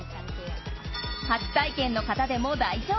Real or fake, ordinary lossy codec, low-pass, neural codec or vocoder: real; MP3, 24 kbps; 7.2 kHz; none